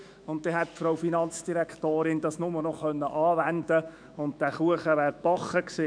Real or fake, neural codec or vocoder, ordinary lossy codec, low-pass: fake; autoencoder, 48 kHz, 128 numbers a frame, DAC-VAE, trained on Japanese speech; none; 9.9 kHz